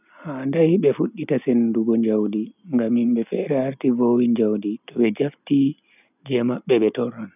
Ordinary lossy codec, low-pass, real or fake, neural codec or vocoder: none; 3.6 kHz; real; none